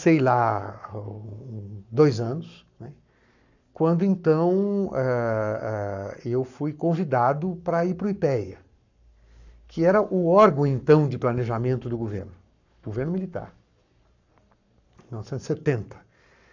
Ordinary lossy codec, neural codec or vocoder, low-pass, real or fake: none; codec, 44.1 kHz, 7.8 kbps, Pupu-Codec; 7.2 kHz; fake